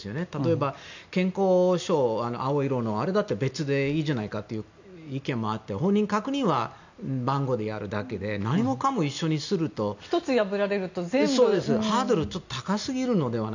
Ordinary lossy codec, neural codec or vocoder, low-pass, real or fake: none; none; 7.2 kHz; real